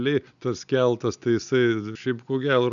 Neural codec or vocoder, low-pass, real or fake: none; 7.2 kHz; real